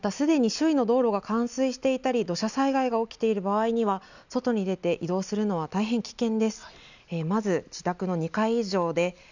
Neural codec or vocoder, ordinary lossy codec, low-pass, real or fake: none; none; 7.2 kHz; real